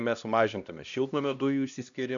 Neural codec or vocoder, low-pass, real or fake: codec, 16 kHz, 1 kbps, X-Codec, HuBERT features, trained on LibriSpeech; 7.2 kHz; fake